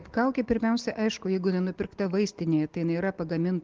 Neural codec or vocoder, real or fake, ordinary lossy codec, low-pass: none; real; Opus, 16 kbps; 7.2 kHz